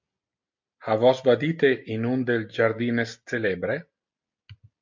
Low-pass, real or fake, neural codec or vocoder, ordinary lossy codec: 7.2 kHz; real; none; MP3, 48 kbps